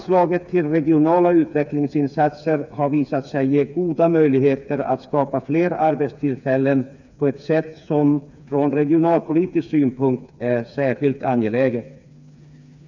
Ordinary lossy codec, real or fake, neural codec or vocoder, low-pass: none; fake; codec, 16 kHz, 4 kbps, FreqCodec, smaller model; 7.2 kHz